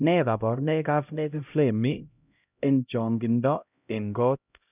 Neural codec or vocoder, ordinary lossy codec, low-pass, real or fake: codec, 16 kHz, 0.5 kbps, X-Codec, HuBERT features, trained on LibriSpeech; none; 3.6 kHz; fake